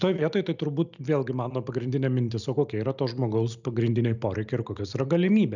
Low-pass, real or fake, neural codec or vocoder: 7.2 kHz; real; none